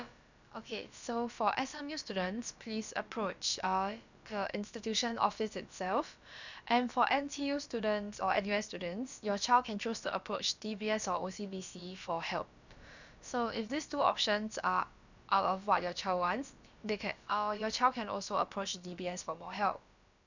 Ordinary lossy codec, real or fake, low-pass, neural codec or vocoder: none; fake; 7.2 kHz; codec, 16 kHz, about 1 kbps, DyCAST, with the encoder's durations